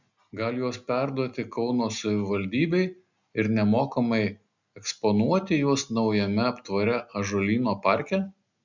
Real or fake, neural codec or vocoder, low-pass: real; none; 7.2 kHz